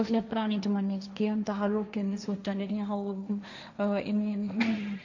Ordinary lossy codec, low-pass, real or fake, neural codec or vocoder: none; 7.2 kHz; fake; codec, 16 kHz, 1.1 kbps, Voila-Tokenizer